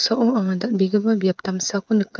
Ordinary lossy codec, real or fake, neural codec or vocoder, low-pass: none; fake; codec, 16 kHz, 8 kbps, FreqCodec, smaller model; none